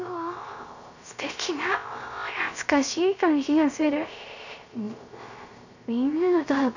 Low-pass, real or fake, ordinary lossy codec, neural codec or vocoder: 7.2 kHz; fake; none; codec, 16 kHz, 0.3 kbps, FocalCodec